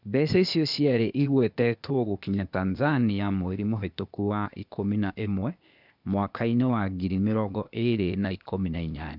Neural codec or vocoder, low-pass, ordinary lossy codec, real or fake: codec, 16 kHz, 0.8 kbps, ZipCodec; 5.4 kHz; none; fake